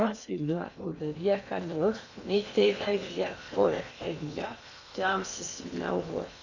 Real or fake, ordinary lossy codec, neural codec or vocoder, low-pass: fake; none; codec, 16 kHz in and 24 kHz out, 0.8 kbps, FocalCodec, streaming, 65536 codes; 7.2 kHz